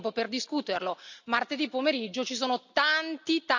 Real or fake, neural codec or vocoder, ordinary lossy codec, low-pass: real; none; none; 7.2 kHz